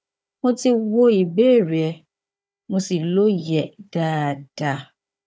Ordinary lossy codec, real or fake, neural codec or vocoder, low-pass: none; fake; codec, 16 kHz, 4 kbps, FunCodec, trained on Chinese and English, 50 frames a second; none